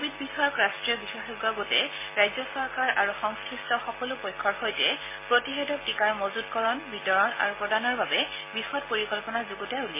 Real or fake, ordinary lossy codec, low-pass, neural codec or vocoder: real; MP3, 16 kbps; 3.6 kHz; none